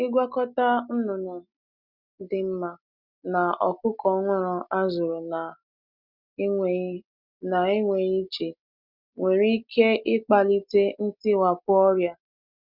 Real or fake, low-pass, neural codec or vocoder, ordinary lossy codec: real; 5.4 kHz; none; none